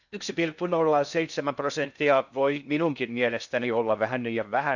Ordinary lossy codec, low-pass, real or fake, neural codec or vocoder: none; 7.2 kHz; fake; codec, 16 kHz in and 24 kHz out, 0.6 kbps, FocalCodec, streaming, 2048 codes